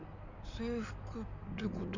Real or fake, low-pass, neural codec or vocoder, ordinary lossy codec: fake; 7.2 kHz; codec, 16 kHz in and 24 kHz out, 2.2 kbps, FireRedTTS-2 codec; none